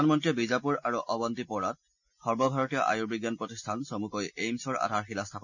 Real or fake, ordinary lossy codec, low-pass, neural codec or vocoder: real; none; 7.2 kHz; none